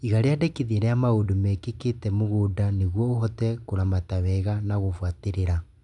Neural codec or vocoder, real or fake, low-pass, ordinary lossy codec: none; real; 10.8 kHz; none